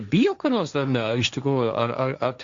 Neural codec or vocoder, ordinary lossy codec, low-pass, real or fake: codec, 16 kHz, 1.1 kbps, Voila-Tokenizer; Opus, 64 kbps; 7.2 kHz; fake